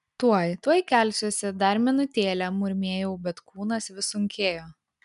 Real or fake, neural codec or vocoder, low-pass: real; none; 10.8 kHz